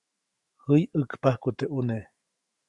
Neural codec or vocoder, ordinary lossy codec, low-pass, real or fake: autoencoder, 48 kHz, 128 numbers a frame, DAC-VAE, trained on Japanese speech; Opus, 64 kbps; 10.8 kHz; fake